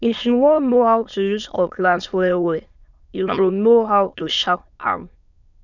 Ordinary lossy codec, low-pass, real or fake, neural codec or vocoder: none; 7.2 kHz; fake; autoencoder, 22.05 kHz, a latent of 192 numbers a frame, VITS, trained on many speakers